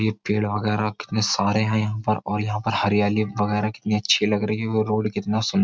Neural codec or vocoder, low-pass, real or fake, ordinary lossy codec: none; none; real; none